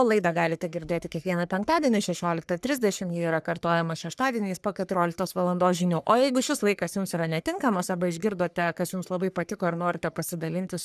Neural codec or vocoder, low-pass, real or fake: codec, 44.1 kHz, 3.4 kbps, Pupu-Codec; 14.4 kHz; fake